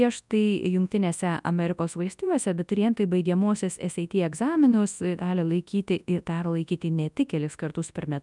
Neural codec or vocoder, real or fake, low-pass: codec, 24 kHz, 0.9 kbps, WavTokenizer, large speech release; fake; 10.8 kHz